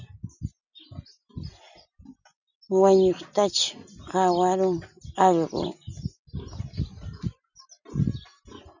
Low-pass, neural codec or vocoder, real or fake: 7.2 kHz; none; real